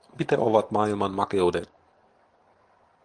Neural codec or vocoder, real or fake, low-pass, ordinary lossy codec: none; real; 9.9 kHz; Opus, 24 kbps